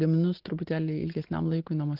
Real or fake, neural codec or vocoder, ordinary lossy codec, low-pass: real; none; Opus, 16 kbps; 5.4 kHz